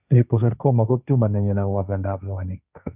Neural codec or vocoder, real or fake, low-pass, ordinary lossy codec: codec, 16 kHz, 1.1 kbps, Voila-Tokenizer; fake; 3.6 kHz; none